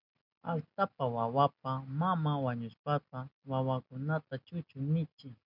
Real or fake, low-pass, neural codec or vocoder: real; 5.4 kHz; none